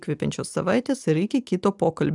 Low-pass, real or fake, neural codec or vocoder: 10.8 kHz; real; none